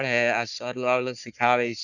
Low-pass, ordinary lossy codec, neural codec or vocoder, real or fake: 7.2 kHz; none; codec, 44.1 kHz, 3.4 kbps, Pupu-Codec; fake